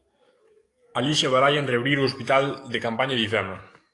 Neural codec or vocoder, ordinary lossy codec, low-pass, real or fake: codec, 44.1 kHz, 7.8 kbps, DAC; AAC, 48 kbps; 10.8 kHz; fake